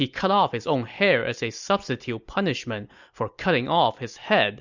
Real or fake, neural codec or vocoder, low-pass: real; none; 7.2 kHz